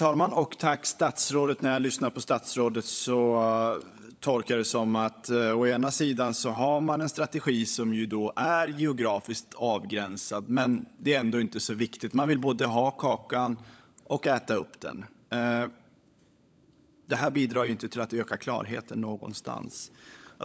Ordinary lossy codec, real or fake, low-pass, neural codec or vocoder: none; fake; none; codec, 16 kHz, 16 kbps, FunCodec, trained on LibriTTS, 50 frames a second